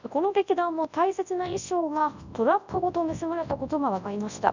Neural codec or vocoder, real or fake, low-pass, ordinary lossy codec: codec, 24 kHz, 0.9 kbps, WavTokenizer, large speech release; fake; 7.2 kHz; none